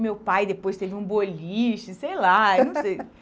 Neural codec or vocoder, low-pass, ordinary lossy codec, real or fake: none; none; none; real